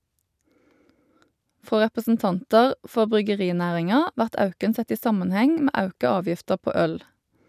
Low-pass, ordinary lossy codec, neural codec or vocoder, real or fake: 14.4 kHz; none; none; real